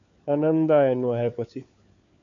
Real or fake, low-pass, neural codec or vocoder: fake; 7.2 kHz; codec, 16 kHz, 4 kbps, FunCodec, trained on LibriTTS, 50 frames a second